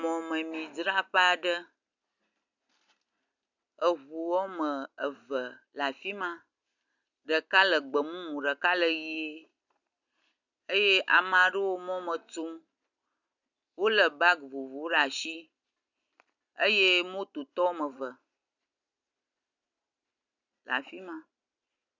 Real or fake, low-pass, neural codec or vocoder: real; 7.2 kHz; none